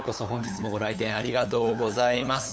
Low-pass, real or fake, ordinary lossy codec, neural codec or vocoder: none; fake; none; codec, 16 kHz, 8 kbps, FunCodec, trained on LibriTTS, 25 frames a second